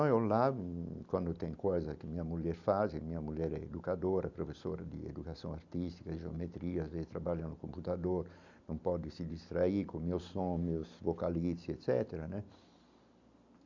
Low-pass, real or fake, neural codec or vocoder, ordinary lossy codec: 7.2 kHz; real; none; none